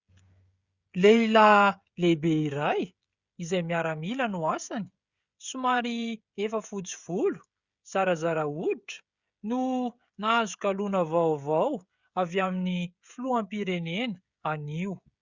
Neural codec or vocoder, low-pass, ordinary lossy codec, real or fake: codec, 16 kHz, 16 kbps, FreqCodec, smaller model; 7.2 kHz; Opus, 64 kbps; fake